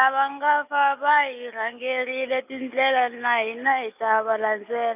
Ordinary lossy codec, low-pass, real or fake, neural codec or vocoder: none; 3.6 kHz; real; none